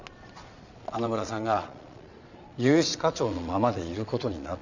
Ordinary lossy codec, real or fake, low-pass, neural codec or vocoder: none; fake; 7.2 kHz; vocoder, 22.05 kHz, 80 mel bands, Vocos